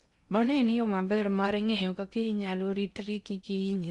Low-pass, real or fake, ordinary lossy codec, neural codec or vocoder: 10.8 kHz; fake; Opus, 64 kbps; codec, 16 kHz in and 24 kHz out, 0.6 kbps, FocalCodec, streaming, 2048 codes